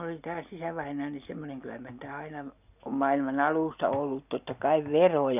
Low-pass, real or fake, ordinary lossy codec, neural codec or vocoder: 3.6 kHz; real; none; none